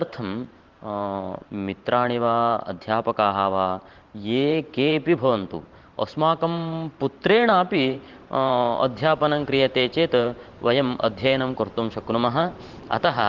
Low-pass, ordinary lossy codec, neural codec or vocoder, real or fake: 7.2 kHz; Opus, 16 kbps; vocoder, 44.1 kHz, 128 mel bands every 512 samples, BigVGAN v2; fake